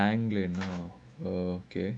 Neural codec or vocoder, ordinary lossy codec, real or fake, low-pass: autoencoder, 48 kHz, 128 numbers a frame, DAC-VAE, trained on Japanese speech; none; fake; 9.9 kHz